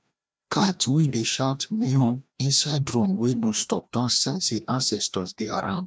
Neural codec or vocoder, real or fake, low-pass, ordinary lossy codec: codec, 16 kHz, 1 kbps, FreqCodec, larger model; fake; none; none